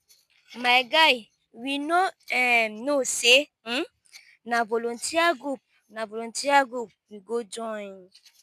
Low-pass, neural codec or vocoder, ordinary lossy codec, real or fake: 14.4 kHz; none; none; real